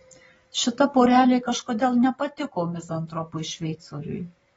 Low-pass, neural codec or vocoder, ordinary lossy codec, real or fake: 19.8 kHz; none; AAC, 24 kbps; real